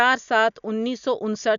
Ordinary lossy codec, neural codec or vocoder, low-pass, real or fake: none; none; 7.2 kHz; real